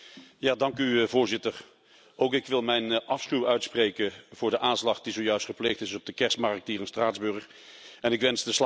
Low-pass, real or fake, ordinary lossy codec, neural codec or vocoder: none; real; none; none